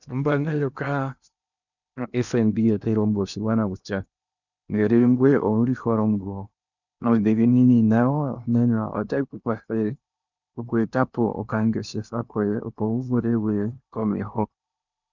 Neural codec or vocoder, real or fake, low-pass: codec, 16 kHz in and 24 kHz out, 0.8 kbps, FocalCodec, streaming, 65536 codes; fake; 7.2 kHz